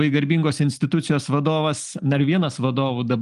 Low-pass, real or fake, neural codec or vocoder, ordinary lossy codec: 10.8 kHz; real; none; Opus, 24 kbps